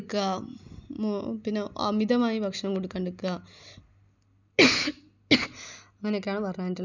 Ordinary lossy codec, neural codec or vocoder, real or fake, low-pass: none; none; real; 7.2 kHz